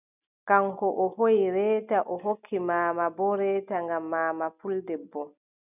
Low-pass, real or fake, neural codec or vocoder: 3.6 kHz; real; none